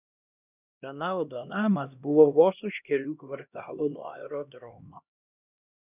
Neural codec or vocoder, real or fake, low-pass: codec, 16 kHz, 1 kbps, X-Codec, HuBERT features, trained on LibriSpeech; fake; 3.6 kHz